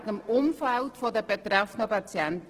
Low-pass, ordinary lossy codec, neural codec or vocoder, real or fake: 14.4 kHz; Opus, 16 kbps; none; real